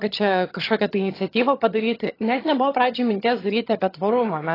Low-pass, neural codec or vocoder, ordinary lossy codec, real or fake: 5.4 kHz; vocoder, 22.05 kHz, 80 mel bands, HiFi-GAN; AAC, 24 kbps; fake